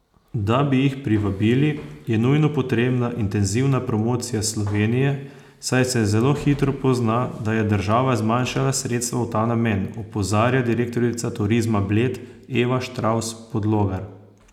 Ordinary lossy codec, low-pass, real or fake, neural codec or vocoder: none; 19.8 kHz; real; none